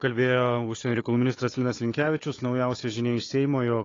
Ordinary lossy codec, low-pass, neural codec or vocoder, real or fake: AAC, 32 kbps; 7.2 kHz; codec, 16 kHz, 4 kbps, FunCodec, trained on Chinese and English, 50 frames a second; fake